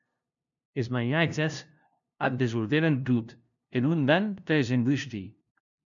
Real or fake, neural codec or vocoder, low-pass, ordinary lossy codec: fake; codec, 16 kHz, 0.5 kbps, FunCodec, trained on LibriTTS, 25 frames a second; 7.2 kHz; MP3, 96 kbps